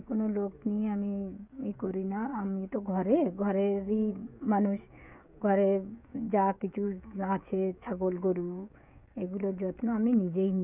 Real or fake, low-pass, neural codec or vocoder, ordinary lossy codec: fake; 3.6 kHz; codec, 16 kHz, 16 kbps, FreqCodec, smaller model; none